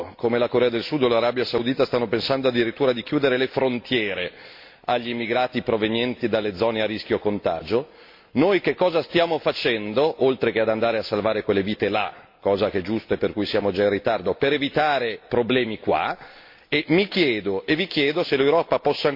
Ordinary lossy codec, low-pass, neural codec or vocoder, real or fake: MP3, 32 kbps; 5.4 kHz; none; real